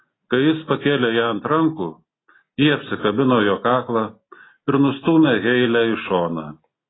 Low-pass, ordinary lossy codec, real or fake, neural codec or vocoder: 7.2 kHz; AAC, 16 kbps; real; none